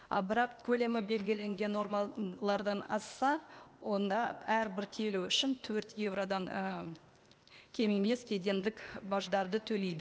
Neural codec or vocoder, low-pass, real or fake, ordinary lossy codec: codec, 16 kHz, 0.8 kbps, ZipCodec; none; fake; none